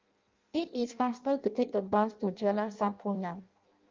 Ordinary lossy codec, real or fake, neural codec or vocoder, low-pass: Opus, 32 kbps; fake; codec, 16 kHz in and 24 kHz out, 0.6 kbps, FireRedTTS-2 codec; 7.2 kHz